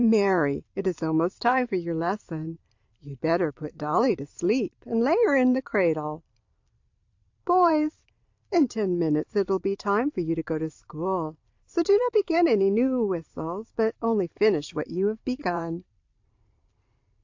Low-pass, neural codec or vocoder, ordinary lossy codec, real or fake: 7.2 kHz; vocoder, 44.1 kHz, 128 mel bands every 512 samples, BigVGAN v2; AAC, 48 kbps; fake